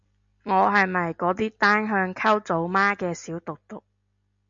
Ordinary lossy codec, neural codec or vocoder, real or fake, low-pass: MP3, 64 kbps; none; real; 7.2 kHz